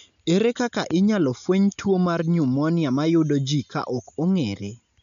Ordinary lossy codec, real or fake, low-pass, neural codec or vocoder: none; real; 7.2 kHz; none